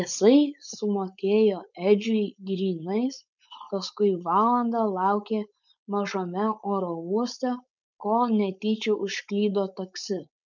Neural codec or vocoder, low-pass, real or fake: codec, 16 kHz, 4.8 kbps, FACodec; 7.2 kHz; fake